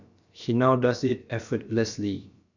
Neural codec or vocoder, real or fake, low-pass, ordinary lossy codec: codec, 16 kHz, about 1 kbps, DyCAST, with the encoder's durations; fake; 7.2 kHz; none